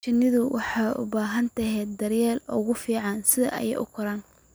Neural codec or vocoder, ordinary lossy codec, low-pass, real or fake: none; none; none; real